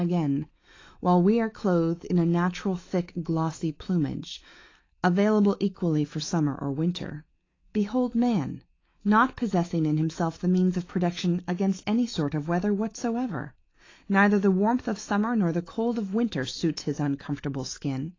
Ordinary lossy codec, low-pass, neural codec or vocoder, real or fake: AAC, 32 kbps; 7.2 kHz; autoencoder, 48 kHz, 128 numbers a frame, DAC-VAE, trained on Japanese speech; fake